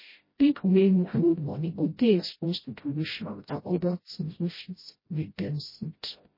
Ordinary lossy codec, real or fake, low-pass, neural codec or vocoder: MP3, 24 kbps; fake; 5.4 kHz; codec, 16 kHz, 0.5 kbps, FreqCodec, smaller model